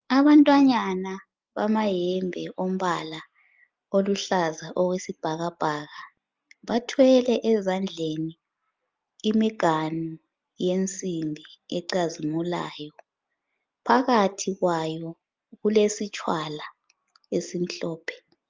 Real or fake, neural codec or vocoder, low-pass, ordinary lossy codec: real; none; 7.2 kHz; Opus, 24 kbps